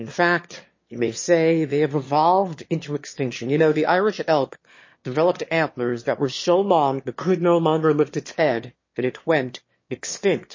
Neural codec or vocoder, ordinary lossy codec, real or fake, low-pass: autoencoder, 22.05 kHz, a latent of 192 numbers a frame, VITS, trained on one speaker; MP3, 32 kbps; fake; 7.2 kHz